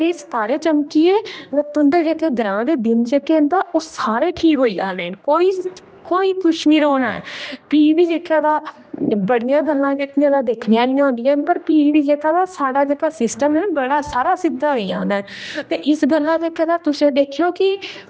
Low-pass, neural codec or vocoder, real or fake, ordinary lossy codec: none; codec, 16 kHz, 1 kbps, X-Codec, HuBERT features, trained on general audio; fake; none